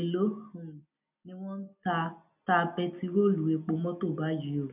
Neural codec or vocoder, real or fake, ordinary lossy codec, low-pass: none; real; none; 3.6 kHz